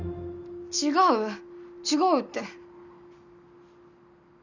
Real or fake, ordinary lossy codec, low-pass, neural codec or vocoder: real; none; 7.2 kHz; none